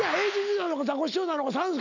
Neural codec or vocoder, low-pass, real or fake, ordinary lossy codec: none; 7.2 kHz; real; none